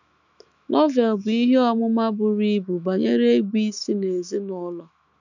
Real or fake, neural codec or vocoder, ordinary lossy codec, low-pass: fake; autoencoder, 48 kHz, 128 numbers a frame, DAC-VAE, trained on Japanese speech; none; 7.2 kHz